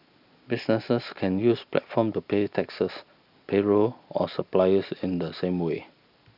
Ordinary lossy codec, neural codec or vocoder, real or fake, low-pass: none; none; real; 5.4 kHz